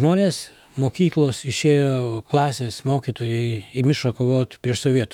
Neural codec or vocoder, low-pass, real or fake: autoencoder, 48 kHz, 32 numbers a frame, DAC-VAE, trained on Japanese speech; 19.8 kHz; fake